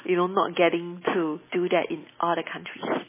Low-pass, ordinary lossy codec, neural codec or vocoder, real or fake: 3.6 kHz; MP3, 16 kbps; none; real